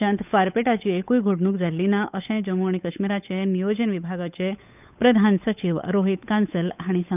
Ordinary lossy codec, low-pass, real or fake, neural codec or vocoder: none; 3.6 kHz; fake; codec, 16 kHz, 8 kbps, FunCodec, trained on Chinese and English, 25 frames a second